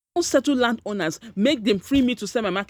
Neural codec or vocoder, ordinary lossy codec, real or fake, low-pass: none; none; real; none